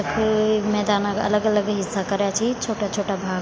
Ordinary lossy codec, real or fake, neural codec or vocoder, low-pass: none; real; none; none